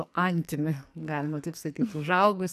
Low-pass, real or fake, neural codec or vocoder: 14.4 kHz; fake; codec, 44.1 kHz, 2.6 kbps, SNAC